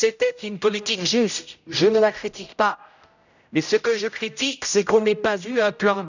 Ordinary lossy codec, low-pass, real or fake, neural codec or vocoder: none; 7.2 kHz; fake; codec, 16 kHz, 0.5 kbps, X-Codec, HuBERT features, trained on general audio